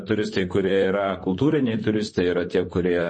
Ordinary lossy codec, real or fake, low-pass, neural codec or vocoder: MP3, 32 kbps; fake; 9.9 kHz; vocoder, 44.1 kHz, 128 mel bands, Pupu-Vocoder